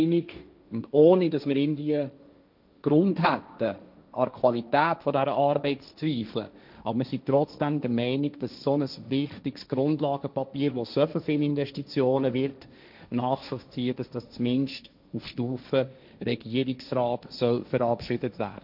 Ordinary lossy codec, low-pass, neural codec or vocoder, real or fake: none; 5.4 kHz; codec, 16 kHz, 1.1 kbps, Voila-Tokenizer; fake